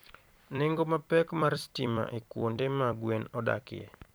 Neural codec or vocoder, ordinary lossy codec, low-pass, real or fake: vocoder, 44.1 kHz, 128 mel bands every 256 samples, BigVGAN v2; none; none; fake